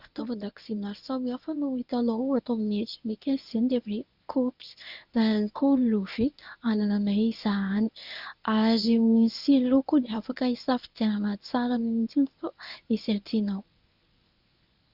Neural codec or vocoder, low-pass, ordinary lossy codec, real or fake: codec, 24 kHz, 0.9 kbps, WavTokenizer, medium speech release version 1; 5.4 kHz; Opus, 64 kbps; fake